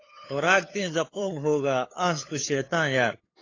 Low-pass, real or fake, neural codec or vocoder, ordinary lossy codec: 7.2 kHz; fake; codec, 16 kHz, 8 kbps, FunCodec, trained on LibriTTS, 25 frames a second; AAC, 32 kbps